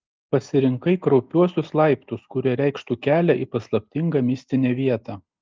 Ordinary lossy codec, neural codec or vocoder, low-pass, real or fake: Opus, 24 kbps; none; 7.2 kHz; real